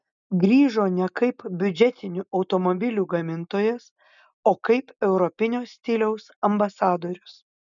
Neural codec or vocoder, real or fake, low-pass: none; real; 7.2 kHz